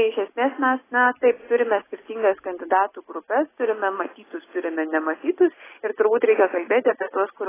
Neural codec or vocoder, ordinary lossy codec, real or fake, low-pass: none; AAC, 16 kbps; real; 3.6 kHz